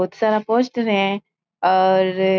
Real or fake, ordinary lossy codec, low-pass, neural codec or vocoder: real; none; none; none